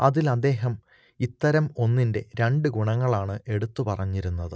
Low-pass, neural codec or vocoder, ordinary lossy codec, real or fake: none; none; none; real